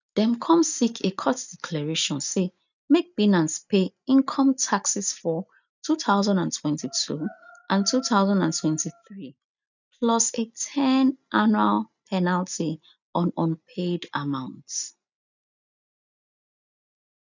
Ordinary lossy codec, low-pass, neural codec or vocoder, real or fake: none; 7.2 kHz; none; real